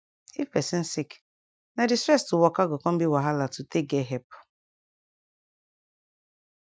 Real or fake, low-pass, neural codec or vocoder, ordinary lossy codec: real; none; none; none